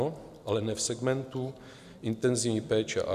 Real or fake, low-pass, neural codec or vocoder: real; 14.4 kHz; none